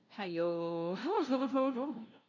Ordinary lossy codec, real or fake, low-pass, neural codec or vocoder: none; fake; 7.2 kHz; codec, 16 kHz, 0.5 kbps, FunCodec, trained on LibriTTS, 25 frames a second